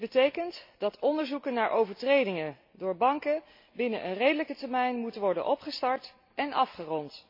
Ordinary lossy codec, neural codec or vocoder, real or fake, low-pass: MP3, 32 kbps; none; real; 5.4 kHz